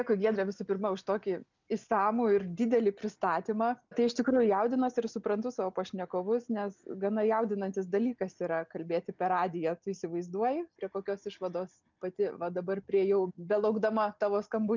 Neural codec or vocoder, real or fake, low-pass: none; real; 7.2 kHz